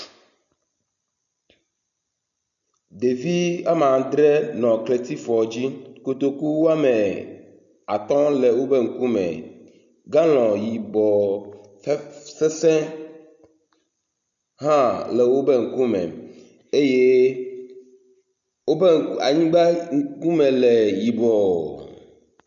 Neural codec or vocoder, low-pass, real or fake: none; 7.2 kHz; real